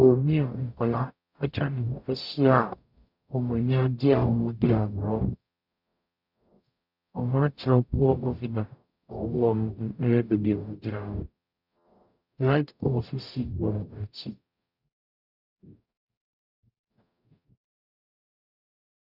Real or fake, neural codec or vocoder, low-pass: fake; codec, 44.1 kHz, 0.9 kbps, DAC; 5.4 kHz